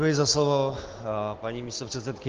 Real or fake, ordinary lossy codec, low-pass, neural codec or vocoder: real; Opus, 16 kbps; 7.2 kHz; none